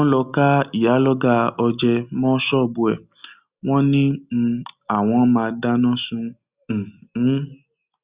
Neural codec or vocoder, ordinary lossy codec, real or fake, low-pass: none; Opus, 64 kbps; real; 3.6 kHz